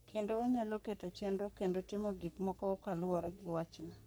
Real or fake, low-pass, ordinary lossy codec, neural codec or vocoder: fake; none; none; codec, 44.1 kHz, 3.4 kbps, Pupu-Codec